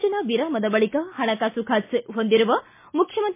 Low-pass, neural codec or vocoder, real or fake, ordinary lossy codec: 3.6 kHz; none; real; MP3, 24 kbps